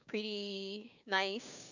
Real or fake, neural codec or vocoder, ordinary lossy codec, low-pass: fake; codec, 16 kHz, 6 kbps, DAC; none; 7.2 kHz